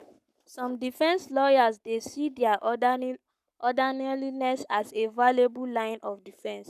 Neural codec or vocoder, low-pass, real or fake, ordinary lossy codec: codec, 44.1 kHz, 7.8 kbps, Pupu-Codec; 14.4 kHz; fake; none